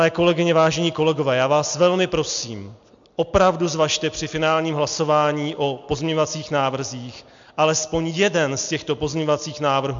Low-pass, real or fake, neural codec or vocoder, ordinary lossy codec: 7.2 kHz; real; none; MP3, 64 kbps